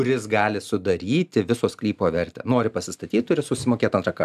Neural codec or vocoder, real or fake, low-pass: none; real; 14.4 kHz